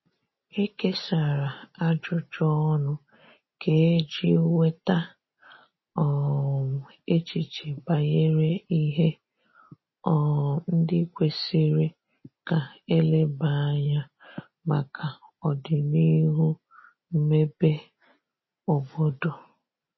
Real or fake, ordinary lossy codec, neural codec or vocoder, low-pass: real; MP3, 24 kbps; none; 7.2 kHz